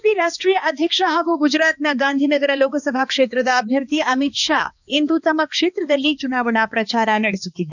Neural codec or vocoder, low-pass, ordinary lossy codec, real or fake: codec, 16 kHz, 2 kbps, X-Codec, HuBERT features, trained on balanced general audio; 7.2 kHz; none; fake